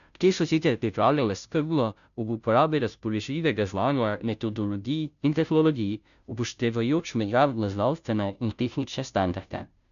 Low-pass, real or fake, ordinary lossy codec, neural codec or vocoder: 7.2 kHz; fake; none; codec, 16 kHz, 0.5 kbps, FunCodec, trained on Chinese and English, 25 frames a second